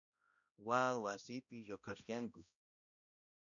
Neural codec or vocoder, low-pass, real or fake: codec, 16 kHz, 1 kbps, X-Codec, HuBERT features, trained on balanced general audio; 7.2 kHz; fake